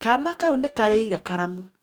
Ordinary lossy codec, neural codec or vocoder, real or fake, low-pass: none; codec, 44.1 kHz, 2.6 kbps, DAC; fake; none